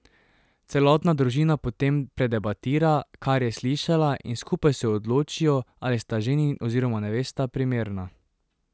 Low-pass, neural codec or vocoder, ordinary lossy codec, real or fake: none; none; none; real